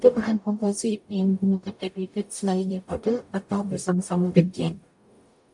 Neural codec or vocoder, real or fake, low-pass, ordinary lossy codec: codec, 44.1 kHz, 0.9 kbps, DAC; fake; 10.8 kHz; MP3, 64 kbps